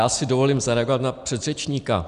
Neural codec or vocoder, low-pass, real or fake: none; 10.8 kHz; real